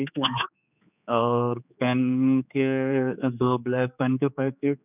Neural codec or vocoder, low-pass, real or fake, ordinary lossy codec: codec, 16 kHz, 4 kbps, X-Codec, HuBERT features, trained on general audio; 3.6 kHz; fake; none